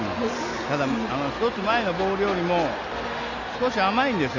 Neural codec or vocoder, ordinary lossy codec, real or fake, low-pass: none; none; real; 7.2 kHz